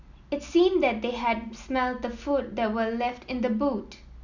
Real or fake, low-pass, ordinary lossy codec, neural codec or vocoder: real; 7.2 kHz; none; none